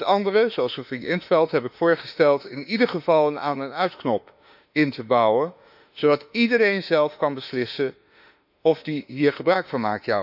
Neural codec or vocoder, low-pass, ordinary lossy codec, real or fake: autoencoder, 48 kHz, 32 numbers a frame, DAC-VAE, trained on Japanese speech; 5.4 kHz; none; fake